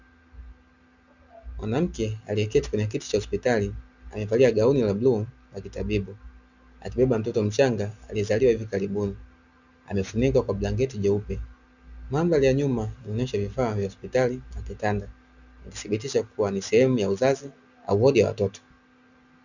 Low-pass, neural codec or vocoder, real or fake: 7.2 kHz; none; real